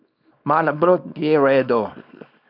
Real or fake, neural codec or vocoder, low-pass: fake; codec, 24 kHz, 0.9 kbps, WavTokenizer, small release; 5.4 kHz